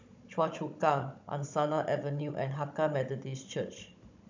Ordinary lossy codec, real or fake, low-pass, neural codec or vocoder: MP3, 64 kbps; fake; 7.2 kHz; codec, 16 kHz, 16 kbps, FunCodec, trained on Chinese and English, 50 frames a second